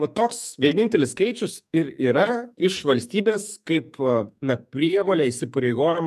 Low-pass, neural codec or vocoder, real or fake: 14.4 kHz; codec, 44.1 kHz, 2.6 kbps, SNAC; fake